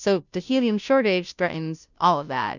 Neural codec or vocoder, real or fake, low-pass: codec, 16 kHz, 0.5 kbps, FunCodec, trained on Chinese and English, 25 frames a second; fake; 7.2 kHz